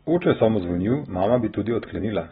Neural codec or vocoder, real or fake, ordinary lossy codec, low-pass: none; real; AAC, 16 kbps; 10.8 kHz